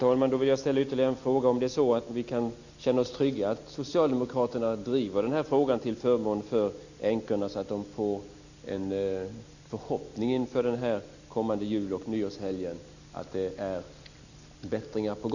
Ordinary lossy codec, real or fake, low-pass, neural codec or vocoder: none; real; 7.2 kHz; none